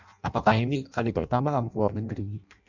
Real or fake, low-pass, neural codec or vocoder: fake; 7.2 kHz; codec, 16 kHz in and 24 kHz out, 0.6 kbps, FireRedTTS-2 codec